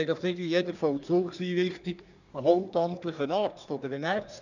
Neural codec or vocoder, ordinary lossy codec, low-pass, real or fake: codec, 24 kHz, 1 kbps, SNAC; none; 7.2 kHz; fake